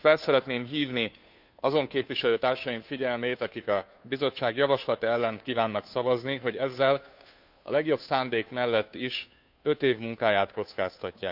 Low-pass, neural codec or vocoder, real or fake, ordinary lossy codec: 5.4 kHz; codec, 16 kHz, 2 kbps, FunCodec, trained on Chinese and English, 25 frames a second; fake; none